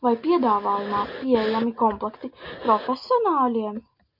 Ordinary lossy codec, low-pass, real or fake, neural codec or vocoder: MP3, 48 kbps; 5.4 kHz; real; none